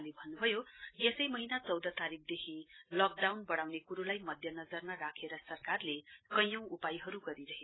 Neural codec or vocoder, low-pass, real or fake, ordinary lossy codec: none; 7.2 kHz; real; AAC, 16 kbps